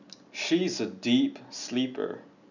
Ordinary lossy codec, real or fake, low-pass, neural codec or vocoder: none; real; 7.2 kHz; none